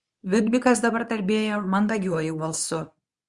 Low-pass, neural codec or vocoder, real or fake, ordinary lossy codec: 10.8 kHz; codec, 24 kHz, 0.9 kbps, WavTokenizer, medium speech release version 2; fake; Opus, 64 kbps